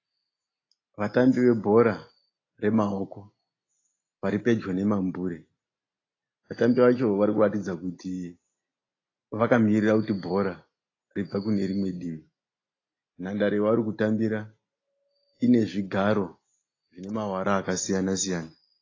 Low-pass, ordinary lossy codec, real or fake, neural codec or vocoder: 7.2 kHz; AAC, 32 kbps; real; none